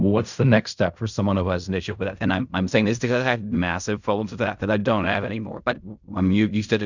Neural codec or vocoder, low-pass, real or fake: codec, 16 kHz in and 24 kHz out, 0.4 kbps, LongCat-Audio-Codec, fine tuned four codebook decoder; 7.2 kHz; fake